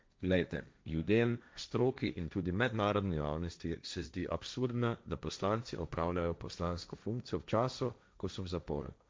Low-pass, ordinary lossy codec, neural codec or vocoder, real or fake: none; none; codec, 16 kHz, 1.1 kbps, Voila-Tokenizer; fake